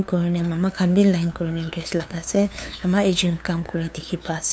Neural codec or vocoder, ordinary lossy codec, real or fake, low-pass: codec, 16 kHz, 2 kbps, FunCodec, trained on LibriTTS, 25 frames a second; none; fake; none